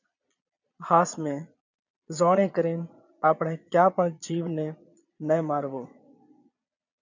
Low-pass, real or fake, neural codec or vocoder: 7.2 kHz; fake; vocoder, 22.05 kHz, 80 mel bands, Vocos